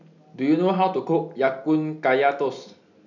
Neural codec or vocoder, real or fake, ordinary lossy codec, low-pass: none; real; none; 7.2 kHz